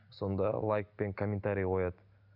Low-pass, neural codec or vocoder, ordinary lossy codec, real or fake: 5.4 kHz; none; none; real